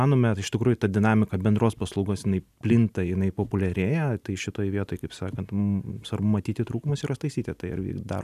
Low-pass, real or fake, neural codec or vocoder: 14.4 kHz; real; none